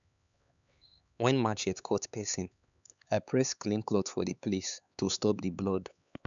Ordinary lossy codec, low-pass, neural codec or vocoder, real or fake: none; 7.2 kHz; codec, 16 kHz, 4 kbps, X-Codec, HuBERT features, trained on LibriSpeech; fake